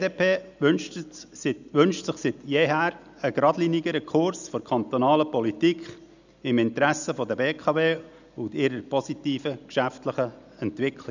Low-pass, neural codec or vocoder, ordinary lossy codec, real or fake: 7.2 kHz; none; none; real